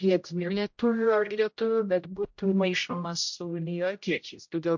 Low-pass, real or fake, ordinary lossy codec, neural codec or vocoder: 7.2 kHz; fake; MP3, 48 kbps; codec, 16 kHz, 0.5 kbps, X-Codec, HuBERT features, trained on general audio